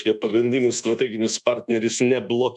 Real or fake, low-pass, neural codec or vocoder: fake; 10.8 kHz; codec, 24 kHz, 1.2 kbps, DualCodec